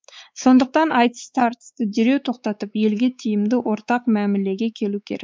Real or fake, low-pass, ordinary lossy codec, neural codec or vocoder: fake; none; none; codec, 16 kHz, 4 kbps, X-Codec, WavLM features, trained on Multilingual LibriSpeech